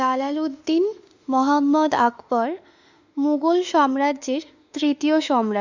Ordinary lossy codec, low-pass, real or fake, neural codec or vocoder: none; 7.2 kHz; fake; autoencoder, 48 kHz, 32 numbers a frame, DAC-VAE, trained on Japanese speech